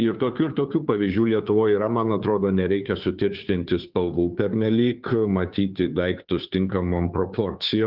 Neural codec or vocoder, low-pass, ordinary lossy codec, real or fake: codec, 16 kHz, 2 kbps, FunCodec, trained on Chinese and English, 25 frames a second; 5.4 kHz; Opus, 32 kbps; fake